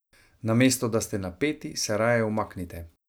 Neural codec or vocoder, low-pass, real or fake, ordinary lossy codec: none; none; real; none